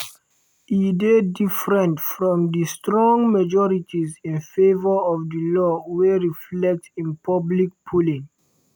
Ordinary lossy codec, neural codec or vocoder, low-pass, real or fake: none; none; none; real